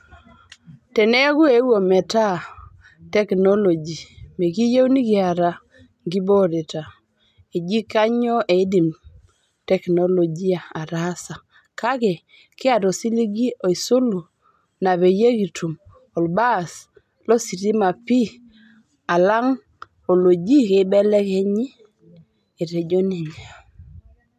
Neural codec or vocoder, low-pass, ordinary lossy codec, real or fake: none; 14.4 kHz; none; real